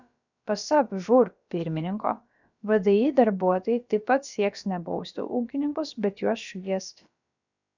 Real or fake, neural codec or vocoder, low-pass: fake; codec, 16 kHz, about 1 kbps, DyCAST, with the encoder's durations; 7.2 kHz